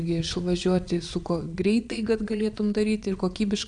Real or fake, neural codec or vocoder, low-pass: fake; vocoder, 22.05 kHz, 80 mel bands, Vocos; 9.9 kHz